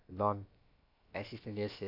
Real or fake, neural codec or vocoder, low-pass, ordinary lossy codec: fake; codec, 16 kHz, about 1 kbps, DyCAST, with the encoder's durations; 5.4 kHz; AAC, 32 kbps